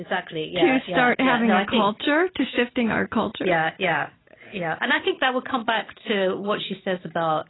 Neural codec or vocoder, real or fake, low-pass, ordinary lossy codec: none; real; 7.2 kHz; AAC, 16 kbps